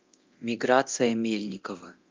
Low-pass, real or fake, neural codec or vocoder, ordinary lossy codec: 7.2 kHz; fake; codec, 24 kHz, 0.9 kbps, DualCodec; Opus, 24 kbps